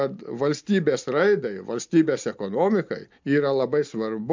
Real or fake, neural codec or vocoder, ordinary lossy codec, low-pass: real; none; MP3, 64 kbps; 7.2 kHz